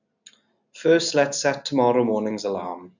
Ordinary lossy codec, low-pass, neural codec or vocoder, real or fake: none; 7.2 kHz; none; real